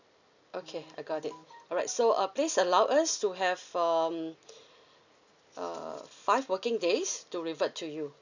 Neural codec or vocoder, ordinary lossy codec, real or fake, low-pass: none; none; real; 7.2 kHz